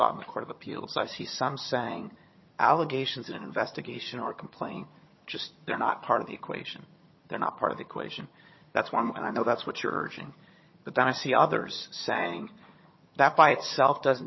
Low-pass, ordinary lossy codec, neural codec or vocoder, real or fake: 7.2 kHz; MP3, 24 kbps; vocoder, 22.05 kHz, 80 mel bands, HiFi-GAN; fake